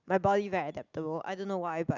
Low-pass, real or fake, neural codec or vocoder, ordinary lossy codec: 7.2 kHz; real; none; Opus, 64 kbps